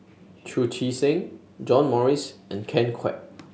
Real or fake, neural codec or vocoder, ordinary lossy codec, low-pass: real; none; none; none